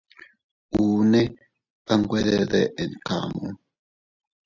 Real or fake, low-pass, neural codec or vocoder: real; 7.2 kHz; none